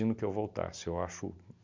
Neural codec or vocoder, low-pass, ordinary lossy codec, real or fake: none; 7.2 kHz; MP3, 64 kbps; real